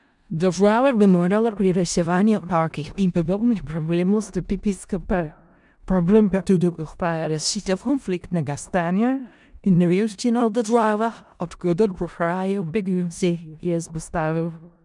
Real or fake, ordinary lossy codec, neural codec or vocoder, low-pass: fake; none; codec, 16 kHz in and 24 kHz out, 0.4 kbps, LongCat-Audio-Codec, four codebook decoder; 10.8 kHz